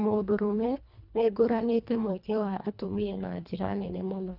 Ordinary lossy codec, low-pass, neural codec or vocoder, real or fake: none; 5.4 kHz; codec, 24 kHz, 1.5 kbps, HILCodec; fake